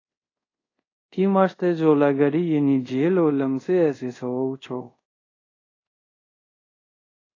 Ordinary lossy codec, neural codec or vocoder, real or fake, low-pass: AAC, 32 kbps; codec, 24 kHz, 0.5 kbps, DualCodec; fake; 7.2 kHz